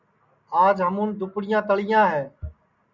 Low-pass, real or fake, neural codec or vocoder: 7.2 kHz; real; none